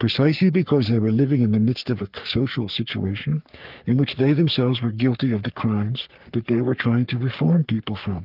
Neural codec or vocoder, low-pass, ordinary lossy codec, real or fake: codec, 44.1 kHz, 3.4 kbps, Pupu-Codec; 5.4 kHz; Opus, 24 kbps; fake